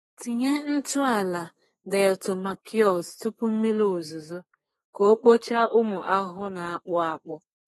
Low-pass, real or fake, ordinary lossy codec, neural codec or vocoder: 14.4 kHz; fake; AAC, 32 kbps; codec, 32 kHz, 1.9 kbps, SNAC